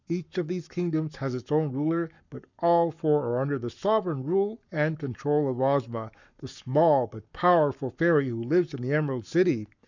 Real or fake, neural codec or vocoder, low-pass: fake; codec, 44.1 kHz, 7.8 kbps, Pupu-Codec; 7.2 kHz